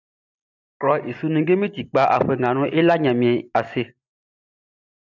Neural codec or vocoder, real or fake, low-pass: none; real; 7.2 kHz